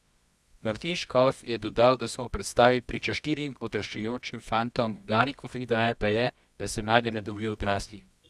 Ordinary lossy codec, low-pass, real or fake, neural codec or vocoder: none; none; fake; codec, 24 kHz, 0.9 kbps, WavTokenizer, medium music audio release